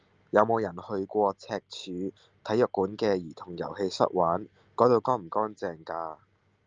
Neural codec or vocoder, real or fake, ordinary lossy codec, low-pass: none; real; Opus, 24 kbps; 7.2 kHz